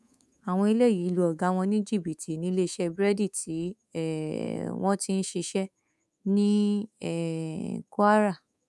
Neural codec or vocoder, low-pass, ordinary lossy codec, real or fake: codec, 24 kHz, 3.1 kbps, DualCodec; none; none; fake